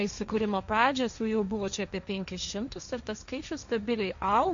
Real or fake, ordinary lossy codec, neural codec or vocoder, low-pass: fake; AAC, 64 kbps; codec, 16 kHz, 1.1 kbps, Voila-Tokenizer; 7.2 kHz